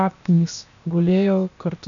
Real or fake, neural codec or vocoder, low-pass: fake; codec, 16 kHz, 0.7 kbps, FocalCodec; 7.2 kHz